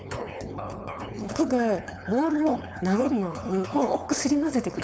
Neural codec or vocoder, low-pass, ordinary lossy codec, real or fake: codec, 16 kHz, 4.8 kbps, FACodec; none; none; fake